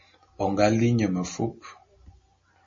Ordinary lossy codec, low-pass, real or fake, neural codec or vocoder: MP3, 32 kbps; 7.2 kHz; real; none